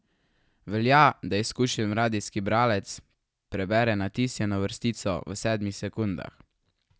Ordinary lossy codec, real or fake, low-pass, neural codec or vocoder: none; real; none; none